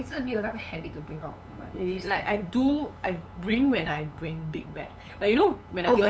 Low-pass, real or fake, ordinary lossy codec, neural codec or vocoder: none; fake; none; codec, 16 kHz, 8 kbps, FunCodec, trained on LibriTTS, 25 frames a second